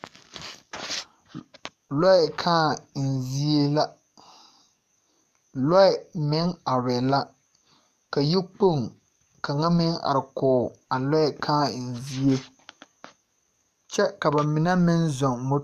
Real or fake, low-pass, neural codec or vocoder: fake; 14.4 kHz; codec, 44.1 kHz, 7.8 kbps, DAC